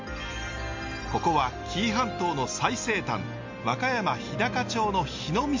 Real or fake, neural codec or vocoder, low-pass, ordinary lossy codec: real; none; 7.2 kHz; MP3, 48 kbps